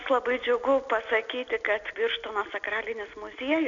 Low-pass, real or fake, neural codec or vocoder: 7.2 kHz; real; none